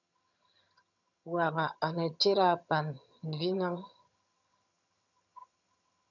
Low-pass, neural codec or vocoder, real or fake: 7.2 kHz; vocoder, 22.05 kHz, 80 mel bands, HiFi-GAN; fake